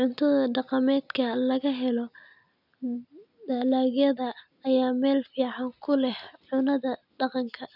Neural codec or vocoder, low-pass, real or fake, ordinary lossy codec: none; 5.4 kHz; real; none